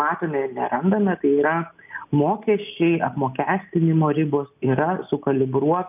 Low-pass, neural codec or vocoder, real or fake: 3.6 kHz; none; real